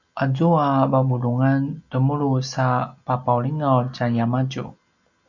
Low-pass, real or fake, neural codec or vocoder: 7.2 kHz; real; none